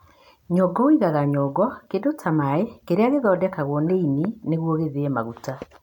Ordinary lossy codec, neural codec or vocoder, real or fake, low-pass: none; none; real; 19.8 kHz